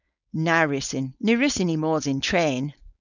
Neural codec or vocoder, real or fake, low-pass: codec, 16 kHz, 4.8 kbps, FACodec; fake; 7.2 kHz